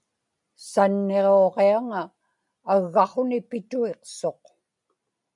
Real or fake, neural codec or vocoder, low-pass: real; none; 10.8 kHz